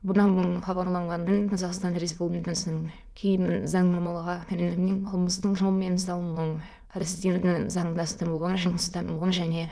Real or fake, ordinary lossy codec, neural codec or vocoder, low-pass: fake; none; autoencoder, 22.05 kHz, a latent of 192 numbers a frame, VITS, trained on many speakers; none